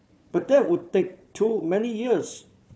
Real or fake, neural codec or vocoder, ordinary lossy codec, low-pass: fake; codec, 16 kHz, 4 kbps, FunCodec, trained on Chinese and English, 50 frames a second; none; none